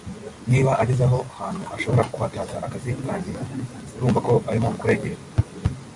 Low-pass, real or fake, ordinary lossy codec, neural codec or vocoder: 10.8 kHz; real; MP3, 48 kbps; none